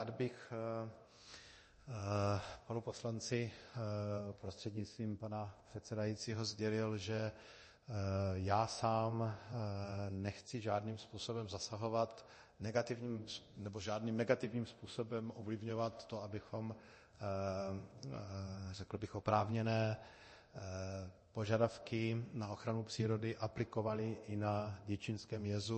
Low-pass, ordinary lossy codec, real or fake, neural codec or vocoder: 10.8 kHz; MP3, 32 kbps; fake; codec, 24 kHz, 0.9 kbps, DualCodec